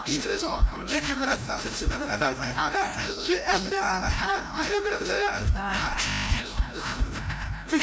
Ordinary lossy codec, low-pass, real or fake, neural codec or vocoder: none; none; fake; codec, 16 kHz, 0.5 kbps, FreqCodec, larger model